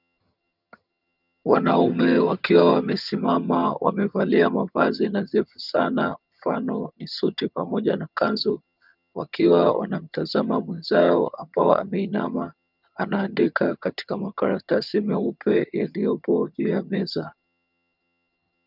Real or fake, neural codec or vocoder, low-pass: fake; vocoder, 22.05 kHz, 80 mel bands, HiFi-GAN; 5.4 kHz